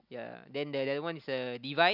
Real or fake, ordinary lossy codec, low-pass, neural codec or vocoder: real; none; 5.4 kHz; none